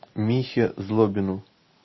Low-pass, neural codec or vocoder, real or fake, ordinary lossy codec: 7.2 kHz; none; real; MP3, 24 kbps